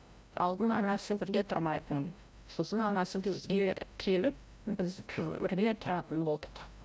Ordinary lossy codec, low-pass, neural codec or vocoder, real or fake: none; none; codec, 16 kHz, 0.5 kbps, FreqCodec, larger model; fake